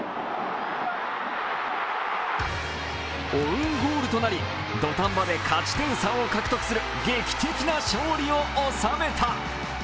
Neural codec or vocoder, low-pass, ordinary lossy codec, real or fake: none; none; none; real